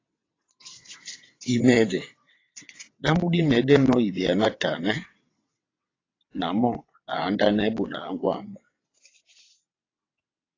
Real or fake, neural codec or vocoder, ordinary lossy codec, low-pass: fake; vocoder, 22.05 kHz, 80 mel bands, WaveNeXt; AAC, 32 kbps; 7.2 kHz